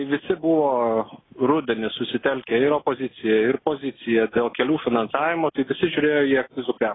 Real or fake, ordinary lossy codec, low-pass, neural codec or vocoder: real; AAC, 16 kbps; 7.2 kHz; none